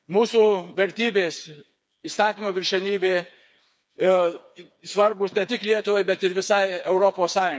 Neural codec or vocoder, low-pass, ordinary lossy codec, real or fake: codec, 16 kHz, 4 kbps, FreqCodec, smaller model; none; none; fake